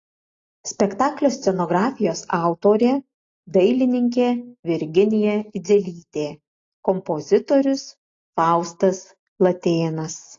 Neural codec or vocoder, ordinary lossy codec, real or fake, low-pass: none; AAC, 32 kbps; real; 7.2 kHz